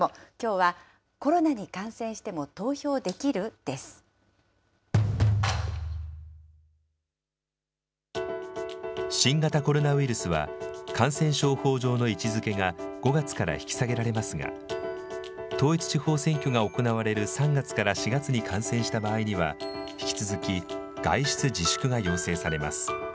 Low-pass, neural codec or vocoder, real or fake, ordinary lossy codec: none; none; real; none